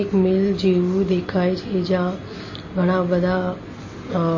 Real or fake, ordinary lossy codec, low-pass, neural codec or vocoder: real; MP3, 32 kbps; 7.2 kHz; none